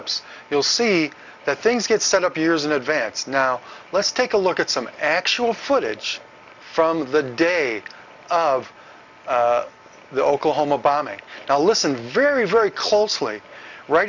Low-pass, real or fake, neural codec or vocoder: 7.2 kHz; real; none